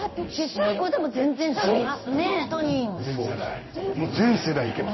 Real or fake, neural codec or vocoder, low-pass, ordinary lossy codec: fake; codec, 16 kHz in and 24 kHz out, 1 kbps, XY-Tokenizer; 7.2 kHz; MP3, 24 kbps